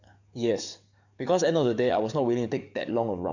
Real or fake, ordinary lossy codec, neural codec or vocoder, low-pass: fake; none; codec, 44.1 kHz, 7.8 kbps, DAC; 7.2 kHz